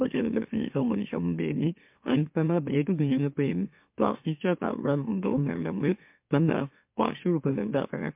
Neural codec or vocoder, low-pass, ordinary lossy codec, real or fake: autoencoder, 44.1 kHz, a latent of 192 numbers a frame, MeloTTS; 3.6 kHz; MP3, 32 kbps; fake